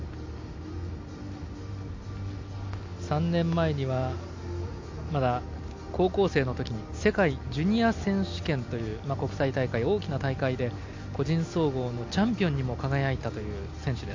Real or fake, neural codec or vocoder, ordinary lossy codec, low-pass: real; none; MP3, 48 kbps; 7.2 kHz